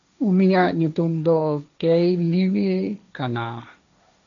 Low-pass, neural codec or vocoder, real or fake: 7.2 kHz; codec, 16 kHz, 1.1 kbps, Voila-Tokenizer; fake